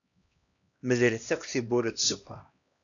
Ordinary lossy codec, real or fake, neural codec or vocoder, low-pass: MP3, 96 kbps; fake; codec, 16 kHz, 1 kbps, X-Codec, HuBERT features, trained on LibriSpeech; 7.2 kHz